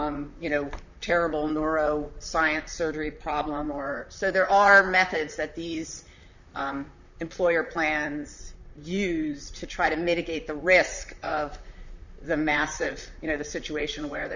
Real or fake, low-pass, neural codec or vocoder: fake; 7.2 kHz; vocoder, 44.1 kHz, 128 mel bands, Pupu-Vocoder